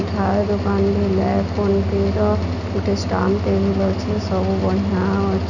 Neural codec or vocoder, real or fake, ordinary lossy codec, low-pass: none; real; none; 7.2 kHz